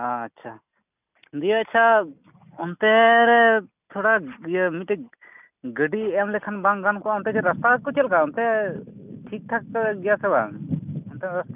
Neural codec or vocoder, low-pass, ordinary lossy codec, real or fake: none; 3.6 kHz; none; real